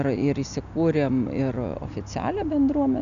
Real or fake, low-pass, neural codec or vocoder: real; 7.2 kHz; none